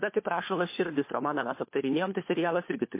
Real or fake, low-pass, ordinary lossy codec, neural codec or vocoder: fake; 3.6 kHz; MP3, 24 kbps; codec, 16 kHz, 4 kbps, FunCodec, trained on LibriTTS, 50 frames a second